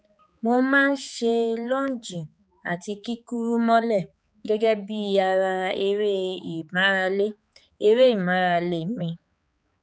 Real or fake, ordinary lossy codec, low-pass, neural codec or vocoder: fake; none; none; codec, 16 kHz, 4 kbps, X-Codec, HuBERT features, trained on balanced general audio